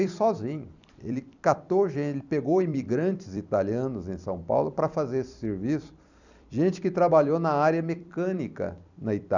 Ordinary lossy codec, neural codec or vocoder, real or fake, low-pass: none; vocoder, 44.1 kHz, 128 mel bands every 256 samples, BigVGAN v2; fake; 7.2 kHz